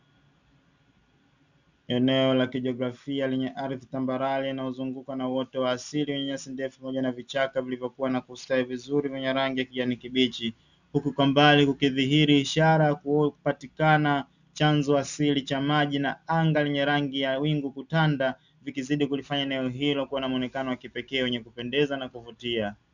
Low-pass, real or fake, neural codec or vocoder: 7.2 kHz; real; none